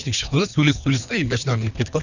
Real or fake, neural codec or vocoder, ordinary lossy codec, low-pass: fake; codec, 24 kHz, 3 kbps, HILCodec; none; 7.2 kHz